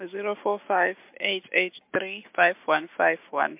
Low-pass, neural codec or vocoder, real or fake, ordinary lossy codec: 3.6 kHz; none; real; MP3, 32 kbps